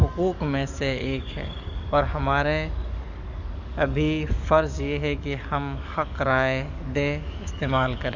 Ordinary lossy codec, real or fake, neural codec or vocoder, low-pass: none; fake; codec, 44.1 kHz, 7.8 kbps, DAC; 7.2 kHz